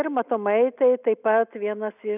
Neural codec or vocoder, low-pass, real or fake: none; 3.6 kHz; real